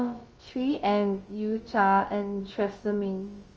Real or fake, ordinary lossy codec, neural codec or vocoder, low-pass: fake; Opus, 24 kbps; codec, 16 kHz, about 1 kbps, DyCAST, with the encoder's durations; 7.2 kHz